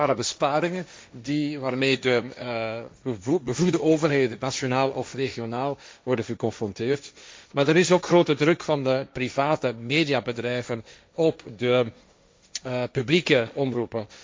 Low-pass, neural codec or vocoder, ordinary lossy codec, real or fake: none; codec, 16 kHz, 1.1 kbps, Voila-Tokenizer; none; fake